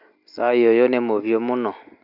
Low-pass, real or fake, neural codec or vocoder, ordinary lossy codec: 5.4 kHz; real; none; none